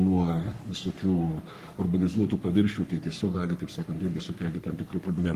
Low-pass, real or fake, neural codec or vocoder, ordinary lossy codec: 14.4 kHz; fake; codec, 44.1 kHz, 3.4 kbps, Pupu-Codec; Opus, 32 kbps